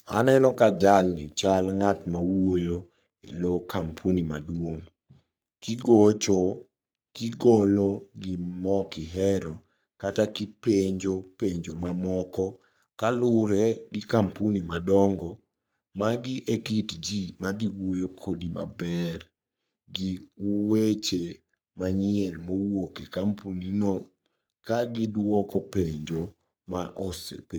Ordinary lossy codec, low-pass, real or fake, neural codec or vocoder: none; none; fake; codec, 44.1 kHz, 3.4 kbps, Pupu-Codec